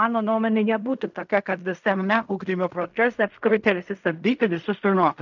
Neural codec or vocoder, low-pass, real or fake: codec, 16 kHz in and 24 kHz out, 0.4 kbps, LongCat-Audio-Codec, fine tuned four codebook decoder; 7.2 kHz; fake